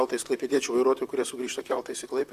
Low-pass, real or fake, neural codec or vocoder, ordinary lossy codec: 14.4 kHz; fake; vocoder, 44.1 kHz, 128 mel bands, Pupu-Vocoder; Opus, 64 kbps